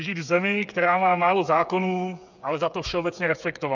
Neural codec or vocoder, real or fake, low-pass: codec, 16 kHz, 8 kbps, FreqCodec, smaller model; fake; 7.2 kHz